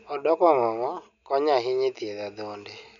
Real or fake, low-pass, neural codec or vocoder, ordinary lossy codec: real; 7.2 kHz; none; none